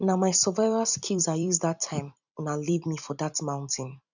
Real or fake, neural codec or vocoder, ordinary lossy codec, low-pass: fake; vocoder, 44.1 kHz, 128 mel bands every 256 samples, BigVGAN v2; none; 7.2 kHz